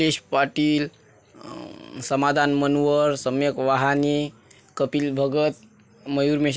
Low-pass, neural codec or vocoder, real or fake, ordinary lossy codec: none; none; real; none